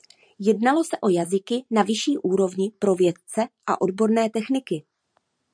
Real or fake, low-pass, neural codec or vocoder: fake; 9.9 kHz; vocoder, 44.1 kHz, 128 mel bands every 512 samples, BigVGAN v2